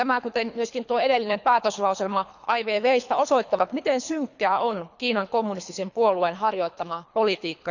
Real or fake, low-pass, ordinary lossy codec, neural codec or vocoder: fake; 7.2 kHz; none; codec, 24 kHz, 3 kbps, HILCodec